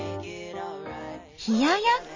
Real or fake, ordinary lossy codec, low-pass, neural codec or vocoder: real; MP3, 32 kbps; 7.2 kHz; none